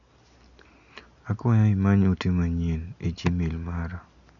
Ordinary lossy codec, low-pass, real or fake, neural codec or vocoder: none; 7.2 kHz; real; none